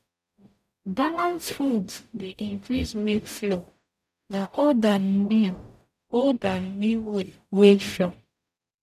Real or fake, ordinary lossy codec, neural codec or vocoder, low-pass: fake; none; codec, 44.1 kHz, 0.9 kbps, DAC; 14.4 kHz